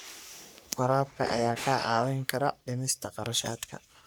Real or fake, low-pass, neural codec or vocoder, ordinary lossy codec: fake; none; codec, 44.1 kHz, 3.4 kbps, Pupu-Codec; none